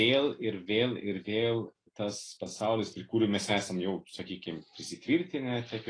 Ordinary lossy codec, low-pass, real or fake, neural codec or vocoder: AAC, 32 kbps; 9.9 kHz; real; none